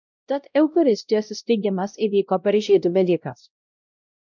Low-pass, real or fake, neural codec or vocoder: 7.2 kHz; fake; codec, 16 kHz, 0.5 kbps, X-Codec, WavLM features, trained on Multilingual LibriSpeech